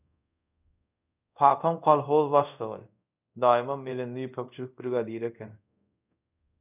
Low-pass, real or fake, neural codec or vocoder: 3.6 kHz; fake; codec, 24 kHz, 0.5 kbps, DualCodec